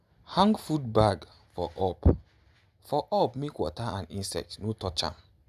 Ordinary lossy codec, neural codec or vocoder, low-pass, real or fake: none; none; 14.4 kHz; real